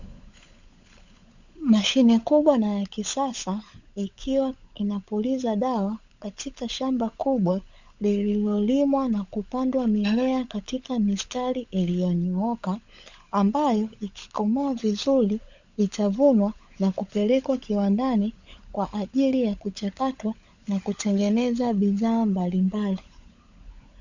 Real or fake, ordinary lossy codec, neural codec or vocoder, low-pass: fake; Opus, 64 kbps; codec, 16 kHz, 16 kbps, FunCodec, trained on LibriTTS, 50 frames a second; 7.2 kHz